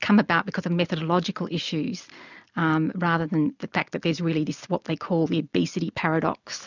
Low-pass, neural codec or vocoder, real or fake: 7.2 kHz; none; real